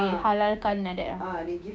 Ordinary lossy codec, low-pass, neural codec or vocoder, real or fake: none; none; codec, 16 kHz, 6 kbps, DAC; fake